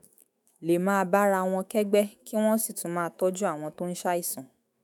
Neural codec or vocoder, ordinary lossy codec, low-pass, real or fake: autoencoder, 48 kHz, 128 numbers a frame, DAC-VAE, trained on Japanese speech; none; none; fake